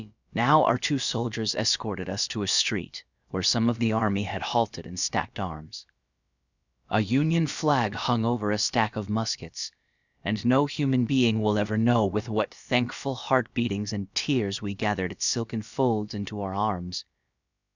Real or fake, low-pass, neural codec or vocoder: fake; 7.2 kHz; codec, 16 kHz, about 1 kbps, DyCAST, with the encoder's durations